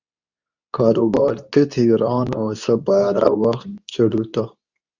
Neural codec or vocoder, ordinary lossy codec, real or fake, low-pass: codec, 24 kHz, 0.9 kbps, WavTokenizer, medium speech release version 2; Opus, 64 kbps; fake; 7.2 kHz